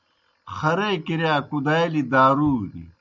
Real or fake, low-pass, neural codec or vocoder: real; 7.2 kHz; none